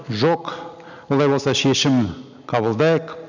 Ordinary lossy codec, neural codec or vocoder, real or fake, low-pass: none; none; real; 7.2 kHz